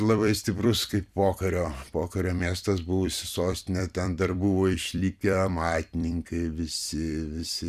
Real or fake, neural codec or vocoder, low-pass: fake; vocoder, 44.1 kHz, 128 mel bands every 256 samples, BigVGAN v2; 14.4 kHz